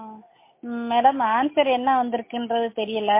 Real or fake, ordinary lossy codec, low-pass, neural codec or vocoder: real; MP3, 24 kbps; 3.6 kHz; none